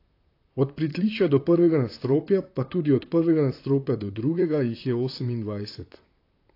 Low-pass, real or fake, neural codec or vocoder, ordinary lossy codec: 5.4 kHz; fake; vocoder, 44.1 kHz, 128 mel bands, Pupu-Vocoder; AAC, 32 kbps